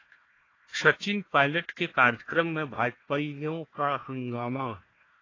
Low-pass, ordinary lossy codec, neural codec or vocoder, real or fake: 7.2 kHz; AAC, 32 kbps; codec, 16 kHz, 1 kbps, FunCodec, trained on Chinese and English, 50 frames a second; fake